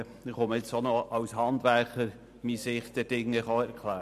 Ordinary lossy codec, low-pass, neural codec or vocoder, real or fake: none; 14.4 kHz; none; real